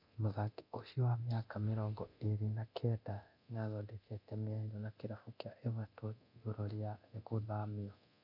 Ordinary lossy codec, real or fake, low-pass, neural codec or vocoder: none; fake; 5.4 kHz; codec, 24 kHz, 0.9 kbps, DualCodec